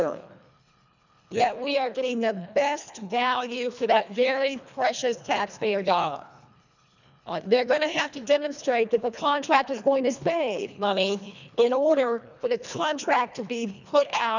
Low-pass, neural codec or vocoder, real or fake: 7.2 kHz; codec, 24 kHz, 1.5 kbps, HILCodec; fake